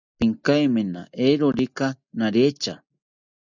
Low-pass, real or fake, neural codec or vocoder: 7.2 kHz; real; none